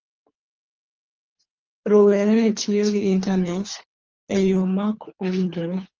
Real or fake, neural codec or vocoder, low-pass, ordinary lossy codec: fake; codec, 24 kHz, 3 kbps, HILCodec; 7.2 kHz; Opus, 24 kbps